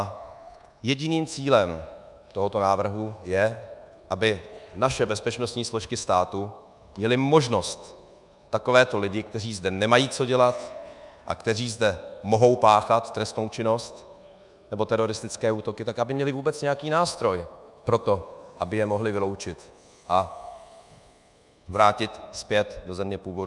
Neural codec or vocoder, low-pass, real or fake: codec, 24 kHz, 1.2 kbps, DualCodec; 10.8 kHz; fake